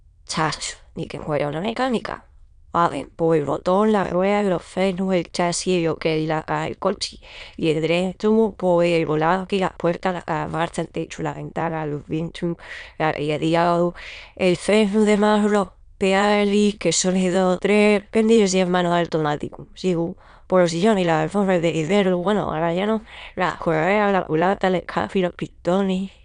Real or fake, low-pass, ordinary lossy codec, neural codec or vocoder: fake; 9.9 kHz; none; autoencoder, 22.05 kHz, a latent of 192 numbers a frame, VITS, trained on many speakers